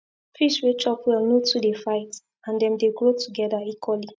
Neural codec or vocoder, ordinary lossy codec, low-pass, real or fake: none; none; none; real